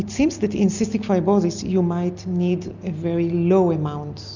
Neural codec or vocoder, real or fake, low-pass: none; real; 7.2 kHz